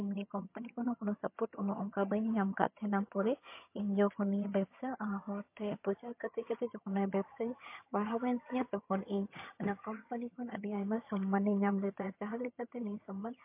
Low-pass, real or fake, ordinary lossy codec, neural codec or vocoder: 3.6 kHz; fake; MP3, 24 kbps; vocoder, 22.05 kHz, 80 mel bands, HiFi-GAN